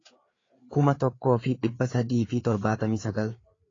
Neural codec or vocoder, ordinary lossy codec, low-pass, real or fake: codec, 16 kHz, 4 kbps, FreqCodec, larger model; AAC, 32 kbps; 7.2 kHz; fake